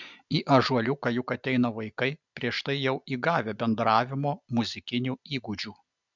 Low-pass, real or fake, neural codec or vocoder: 7.2 kHz; real; none